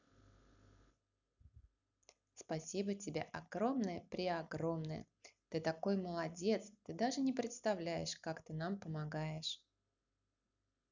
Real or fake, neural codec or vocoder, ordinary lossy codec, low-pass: real; none; none; 7.2 kHz